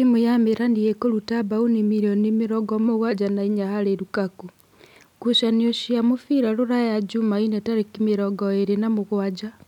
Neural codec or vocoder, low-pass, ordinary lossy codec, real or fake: none; 19.8 kHz; none; real